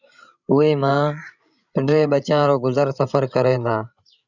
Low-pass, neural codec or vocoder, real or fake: 7.2 kHz; codec, 16 kHz, 16 kbps, FreqCodec, larger model; fake